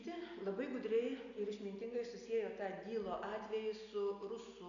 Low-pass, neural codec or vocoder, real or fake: 7.2 kHz; none; real